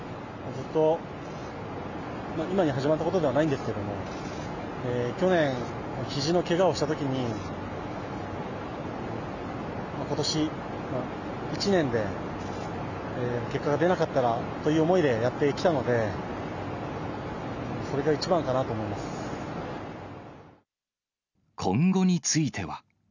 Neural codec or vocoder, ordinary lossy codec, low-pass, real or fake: none; none; 7.2 kHz; real